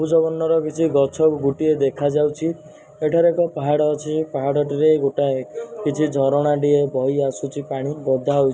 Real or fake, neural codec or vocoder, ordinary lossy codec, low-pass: real; none; none; none